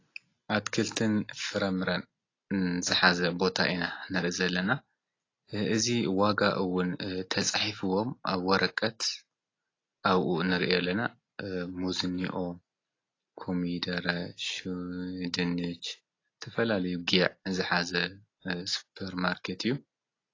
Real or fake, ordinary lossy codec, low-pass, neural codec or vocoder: real; AAC, 32 kbps; 7.2 kHz; none